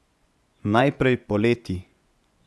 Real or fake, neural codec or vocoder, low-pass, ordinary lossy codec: fake; vocoder, 24 kHz, 100 mel bands, Vocos; none; none